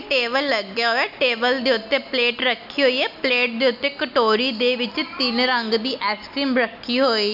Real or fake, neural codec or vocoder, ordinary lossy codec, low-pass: real; none; none; 5.4 kHz